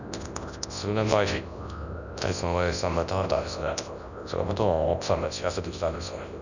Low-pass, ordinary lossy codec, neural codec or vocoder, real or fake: 7.2 kHz; none; codec, 24 kHz, 0.9 kbps, WavTokenizer, large speech release; fake